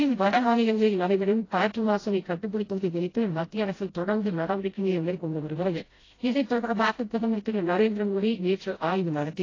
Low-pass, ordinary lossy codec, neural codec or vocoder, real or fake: 7.2 kHz; AAC, 32 kbps; codec, 16 kHz, 0.5 kbps, FreqCodec, smaller model; fake